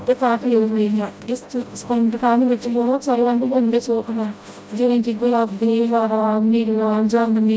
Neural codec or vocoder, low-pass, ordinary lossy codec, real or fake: codec, 16 kHz, 0.5 kbps, FreqCodec, smaller model; none; none; fake